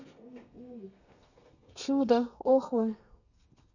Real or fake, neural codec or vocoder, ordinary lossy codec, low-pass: fake; codec, 32 kHz, 1.9 kbps, SNAC; none; 7.2 kHz